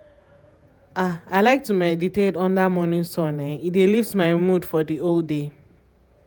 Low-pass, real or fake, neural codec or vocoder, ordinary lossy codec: none; fake; vocoder, 48 kHz, 128 mel bands, Vocos; none